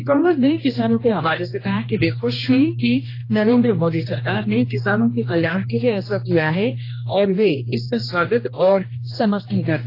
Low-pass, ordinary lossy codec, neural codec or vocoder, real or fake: 5.4 kHz; AAC, 32 kbps; codec, 16 kHz, 1 kbps, X-Codec, HuBERT features, trained on general audio; fake